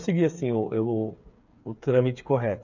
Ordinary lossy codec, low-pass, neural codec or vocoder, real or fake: none; 7.2 kHz; codec, 16 kHz, 8 kbps, FreqCodec, smaller model; fake